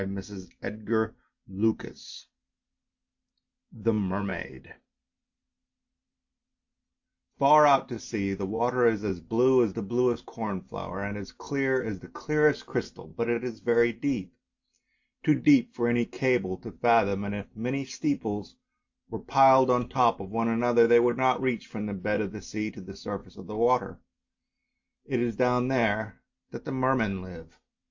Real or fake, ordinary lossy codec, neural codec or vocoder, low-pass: real; AAC, 48 kbps; none; 7.2 kHz